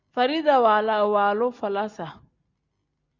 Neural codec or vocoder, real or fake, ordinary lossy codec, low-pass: none; real; Opus, 64 kbps; 7.2 kHz